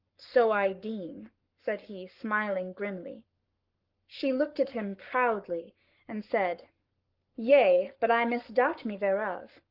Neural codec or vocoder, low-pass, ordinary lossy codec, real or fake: codec, 44.1 kHz, 7.8 kbps, Pupu-Codec; 5.4 kHz; Opus, 24 kbps; fake